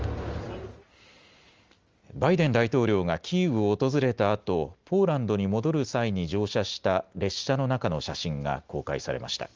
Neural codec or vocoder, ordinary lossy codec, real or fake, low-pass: none; Opus, 32 kbps; real; 7.2 kHz